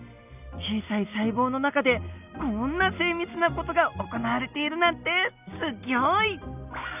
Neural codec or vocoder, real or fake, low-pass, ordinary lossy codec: none; real; 3.6 kHz; none